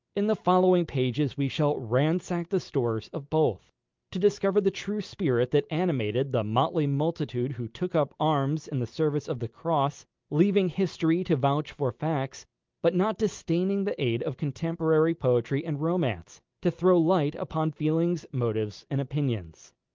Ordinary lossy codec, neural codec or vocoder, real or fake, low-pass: Opus, 24 kbps; none; real; 7.2 kHz